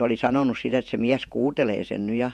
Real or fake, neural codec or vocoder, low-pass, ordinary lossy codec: fake; vocoder, 48 kHz, 128 mel bands, Vocos; 14.4 kHz; MP3, 64 kbps